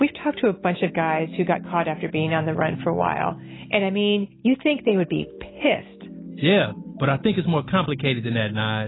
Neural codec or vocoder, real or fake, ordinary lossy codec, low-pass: none; real; AAC, 16 kbps; 7.2 kHz